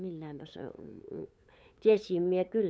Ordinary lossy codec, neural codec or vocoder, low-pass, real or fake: none; codec, 16 kHz, 8 kbps, FunCodec, trained on LibriTTS, 25 frames a second; none; fake